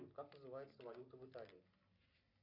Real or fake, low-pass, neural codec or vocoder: real; 5.4 kHz; none